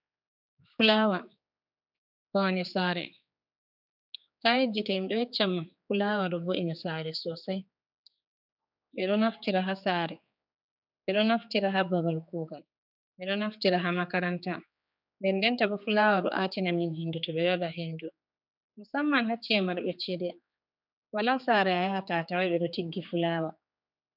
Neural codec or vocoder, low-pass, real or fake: codec, 16 kHz, 4 kbps, X-Codec, HuBERT features, trained on general audio; 5.4 kHz; fake